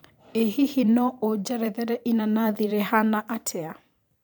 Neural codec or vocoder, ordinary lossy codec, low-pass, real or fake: vocoder, 44.1 kHz, 128 mel bands every 512 samples, BigVGAN v2; none; none; fake